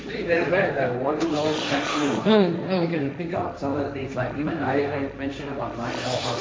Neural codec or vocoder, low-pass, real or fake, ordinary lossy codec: codec, 16 kHz, 1.1 kbps, Voila-Tokenizer; none; fake; none